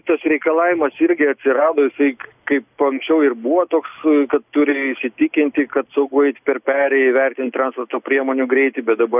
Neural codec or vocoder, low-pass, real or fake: none; 3.6 kHz; real